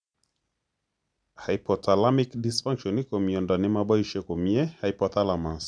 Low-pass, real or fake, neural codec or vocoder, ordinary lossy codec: 9.9 kHz; real; none; none